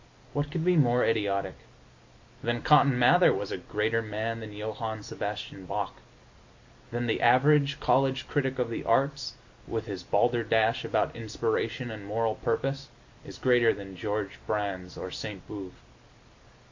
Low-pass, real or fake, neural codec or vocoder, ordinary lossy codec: 7.2 kHz; real; none; MP3, 48 kbps